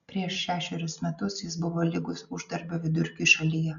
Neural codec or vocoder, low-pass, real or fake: none; 7.2 kHz; real